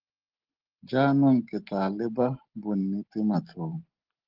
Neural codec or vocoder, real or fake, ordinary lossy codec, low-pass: none; real; Opus, 16 kbps; 5.4 kHz